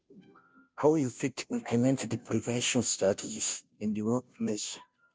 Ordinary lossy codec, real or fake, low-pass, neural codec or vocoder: none; fake; none; codec, 16 kHz, 0.5 kbps, FunCodec, trained on Chinese and English, 25 frames a second